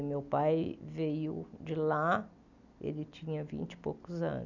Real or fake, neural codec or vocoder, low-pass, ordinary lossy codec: real; none; 7.2 kHz; none